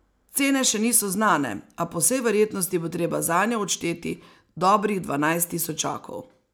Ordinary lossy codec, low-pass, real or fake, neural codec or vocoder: none; none; real; none